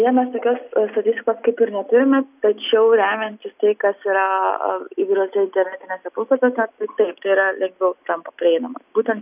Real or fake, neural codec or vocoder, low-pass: real; none; 3.6 kHz